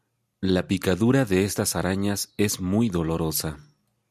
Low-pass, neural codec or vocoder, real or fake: 14.4 kHz; none; real